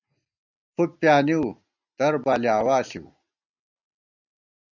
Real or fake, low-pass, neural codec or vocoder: real; 7.2 kHz; none